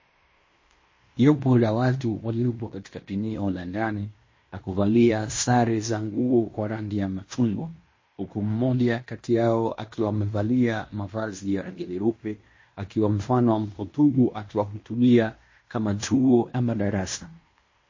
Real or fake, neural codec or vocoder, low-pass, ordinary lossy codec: fake; codec, 16 kHz in and 24 kHz out, 0.9 kbps, LongCat-Audio-Codec, fine tuned four codebook decoder; 7.2 kHz; MP3, 32 kbps